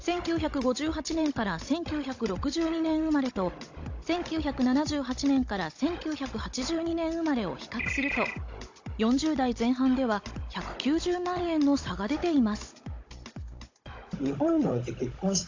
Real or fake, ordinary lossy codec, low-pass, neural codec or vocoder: fake; AAC, 48 kbps; 7.2 kHz; codec, 16 kHz, 16 kbps, FunCodec, trained on Chinese and English, 50 frames a second